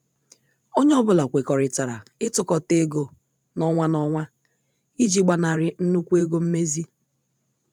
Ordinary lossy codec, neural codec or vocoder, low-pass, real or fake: none; vocoder, 44.1 kHz, 128 mel bands every 512 samples, BigVGAN v2; 19.8 kHz; fake